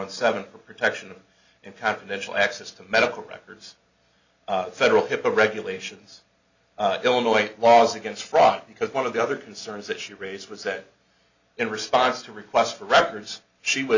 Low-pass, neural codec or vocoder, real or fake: 7.2 kHz; none; real